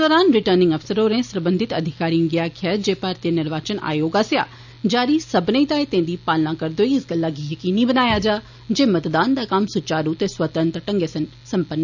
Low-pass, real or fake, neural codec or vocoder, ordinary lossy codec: 7.2 kHz; real; none; none